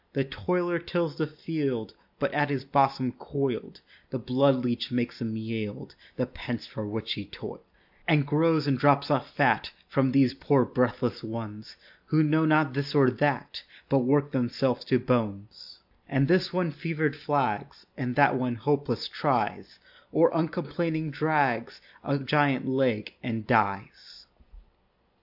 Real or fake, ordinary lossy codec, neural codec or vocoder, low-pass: real; AAC, 48 kbps; none; 5.4 kHz